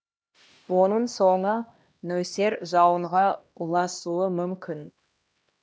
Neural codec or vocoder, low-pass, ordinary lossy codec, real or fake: codec, 16 kHz, 1 kbps, X-Codec, HuBERT features, trained on LibriSpeech; none; none; fake